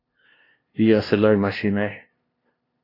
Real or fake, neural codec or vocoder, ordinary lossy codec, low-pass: fake; codec, 16 kHz, 0.5 kbps, FunCodec, trained on LibriTTS, 25 frames a second; AAC, 24 kbps; 5.4 kHz